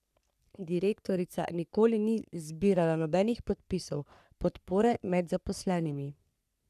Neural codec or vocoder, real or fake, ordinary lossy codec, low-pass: codec, 44.1 kHz, 3.4 kbps, Pupu-Codec; fake; none; 14.4 kHz